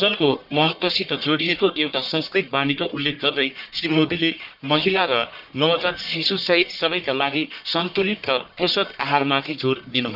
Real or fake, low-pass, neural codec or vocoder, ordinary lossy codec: fake; 5.4 kHz; codec, 44.1 kHz, 1.7 kbps, Pupu-Codec; none